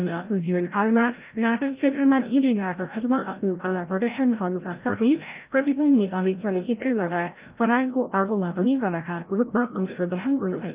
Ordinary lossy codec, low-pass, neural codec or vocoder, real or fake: Opus, 32 kbps; 3.6 kHz; codec, 16 kHz, 0.5 kbps, FreqCodec, larger model; fake